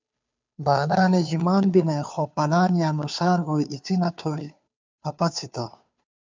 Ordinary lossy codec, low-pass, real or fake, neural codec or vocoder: MP3, 64 kbps; 7.2 kHz; fake; codec, 16 kHz, 2 kbps, FunCodec, trained on Chinese and English, 25 frames a second